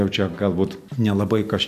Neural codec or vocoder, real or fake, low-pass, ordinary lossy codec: none; real; 14.4 kHz; AAC, 96 kbps